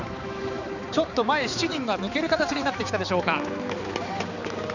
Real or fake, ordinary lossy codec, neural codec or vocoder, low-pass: fake; none; codec, 16 kHz, 4 kbps, X-Codec, HuBERT features, trained on balanced general audio; 7.2 kHz